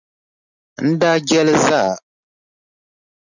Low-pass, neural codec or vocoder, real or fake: 7.2 kHz; none; real